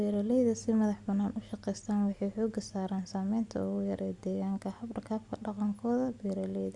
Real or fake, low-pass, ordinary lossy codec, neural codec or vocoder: real; 10.8 kHz; Opus, 64 kbps; none